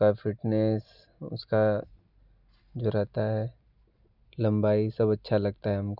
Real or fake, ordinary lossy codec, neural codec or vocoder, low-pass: real; none; none; 5.4 kHz